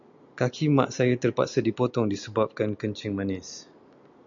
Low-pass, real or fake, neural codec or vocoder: 7.2 kHz; real; none